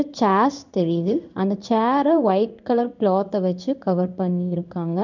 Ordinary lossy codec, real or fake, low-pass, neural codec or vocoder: none; fake; 7.2 kHz; codec, 16 kHz in and 24 kHz out, 1 kbps, XY-Tokenizer